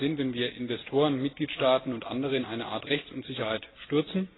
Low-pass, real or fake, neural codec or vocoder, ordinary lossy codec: 7.2 kHz; real; none; AAC, 16 kbps